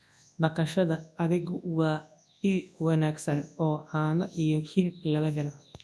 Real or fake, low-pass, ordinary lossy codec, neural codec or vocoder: fake; none; none; codec, 24 kHz, 0.9 kbps, WavTokenizer, large speech release